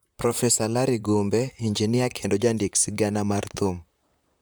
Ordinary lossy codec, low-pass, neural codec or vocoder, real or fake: none; none; vocoder, 44.1 kHz, 128 mel bands, Pupu-Vocoder; fake